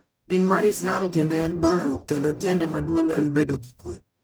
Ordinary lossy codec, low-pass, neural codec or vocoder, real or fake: none; none; codec, 44.1 kHz, 0.9 kbps, DAC; fake